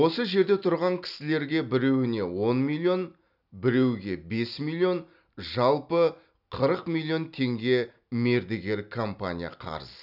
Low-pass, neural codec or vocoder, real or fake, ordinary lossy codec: 5.4 kHz; none; real; none